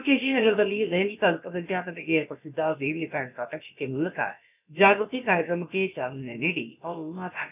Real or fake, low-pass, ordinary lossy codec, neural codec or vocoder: fake; 3.6 kHz; none; codec, 16 kHz, about 1 kbps, DyCAST, with the encoder's durations